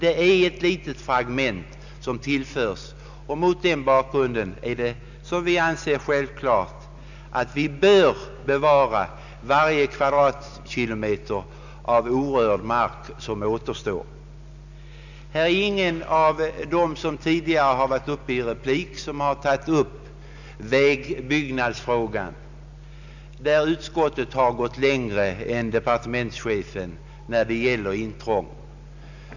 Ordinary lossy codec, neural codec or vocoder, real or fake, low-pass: none; none; real; 7.2 kHz